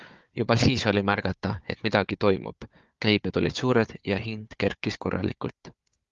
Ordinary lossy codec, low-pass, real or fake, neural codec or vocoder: Opus, 32 kbps; 7.2 kHz; fake; codec, 16 kHz, 4 kbps, FunCodec, trained on Chinese and English, 50 frames a second